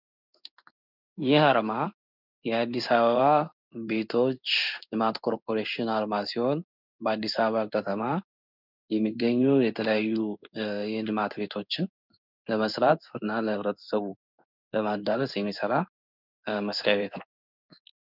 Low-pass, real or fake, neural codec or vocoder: 5.4 kHz; fake; codec, 16 kHz in and 24 kHz out, 1 kbps, XY-Tokenizer